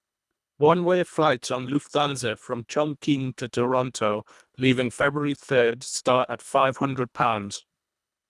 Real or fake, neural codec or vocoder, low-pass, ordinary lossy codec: fake; codec, 24 kHz, 1.5 kbps, HILCodec; none; none